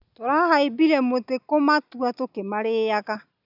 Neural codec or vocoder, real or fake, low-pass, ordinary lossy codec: none; real; 5.4 kHz; none